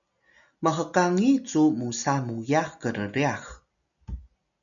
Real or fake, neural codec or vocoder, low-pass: real; none; 7.2 kHz